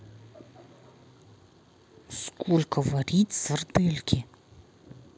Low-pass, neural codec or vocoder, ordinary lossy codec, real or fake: none; none; none; real